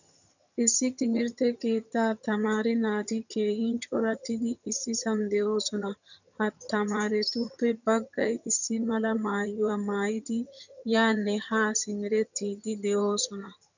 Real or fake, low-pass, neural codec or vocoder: fake; 7.2 kHz; vocoder, 22.05 kHz, 80 mel bands, HiFi-GAN